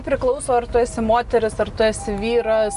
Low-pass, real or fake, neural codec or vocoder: 10.8 kHz; real; none